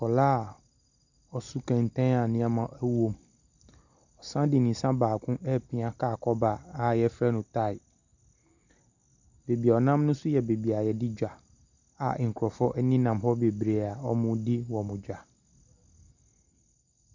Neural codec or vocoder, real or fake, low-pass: none; real; 7.2 kHz